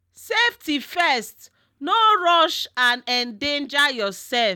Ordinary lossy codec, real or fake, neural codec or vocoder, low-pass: none; real; none; 19.8 kHz